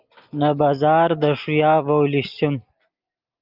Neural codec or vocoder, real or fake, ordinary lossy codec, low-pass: none; real; Opus, 24 kbps; 5.4 kHz